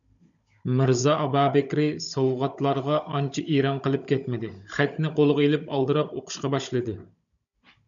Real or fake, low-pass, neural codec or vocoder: fake; 7.2 kHz; codec, 16 kHz, 16 kbps, FunCodec, trained on Chinese and English, 50 frames a second